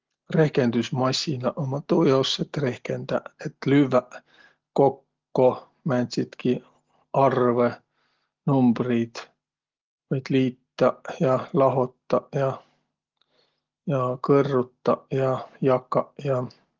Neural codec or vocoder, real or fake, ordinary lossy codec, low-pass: none; real; Opus, 16 kbps; 7.2 kHz